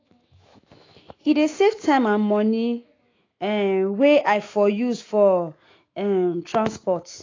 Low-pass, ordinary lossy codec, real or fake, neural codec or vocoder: 7.2 kHz; AAC, 32 kbps; fake; autoencoder, 48 kHz, 128 numbers a frame, DAC-VAE, trained on Japanese speech